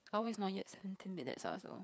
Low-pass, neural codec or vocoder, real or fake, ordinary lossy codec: none; codec, 16 kHz, 4 kbps, FreqCodec, larger model; fake; none